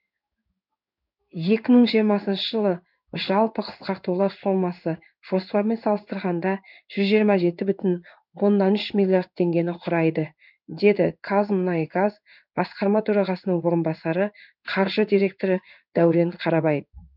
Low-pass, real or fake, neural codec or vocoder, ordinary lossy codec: 5.4 kHz; fake; codec, 16 kHz in and 24 kHz out, 1 kbps, XY-Tokenizer; none